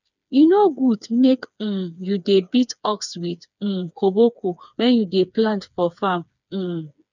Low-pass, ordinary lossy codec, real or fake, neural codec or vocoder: 7.2 kHz; none; fake; codec, 16 kHz, 4 kbps, FreqCodec, smaller model